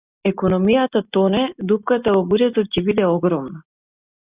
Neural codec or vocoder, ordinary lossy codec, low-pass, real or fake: none; Opus, 64 kbps; 3.6 kHz; real